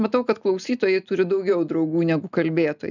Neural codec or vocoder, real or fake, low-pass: none; real; 7.2 kHz